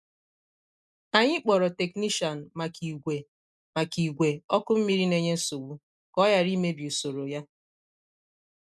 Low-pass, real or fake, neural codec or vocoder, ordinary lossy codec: none; real; none; none